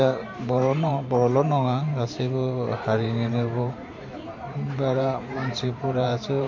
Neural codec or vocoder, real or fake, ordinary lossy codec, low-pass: vocoder, 44.1 kHz, 128 mel bands every 512 samples, BigVGAN v2; fake; MP3, 64 kbps; 7.2 kHz